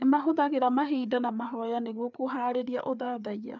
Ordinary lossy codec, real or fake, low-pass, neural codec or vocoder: none; fake; 7.2 kHz; codec, 16 kHz, 4 kbps, FreqCodec, larger model